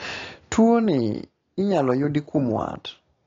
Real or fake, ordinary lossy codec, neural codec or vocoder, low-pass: real; AAC, 32 kbps; none; 7.2 kHz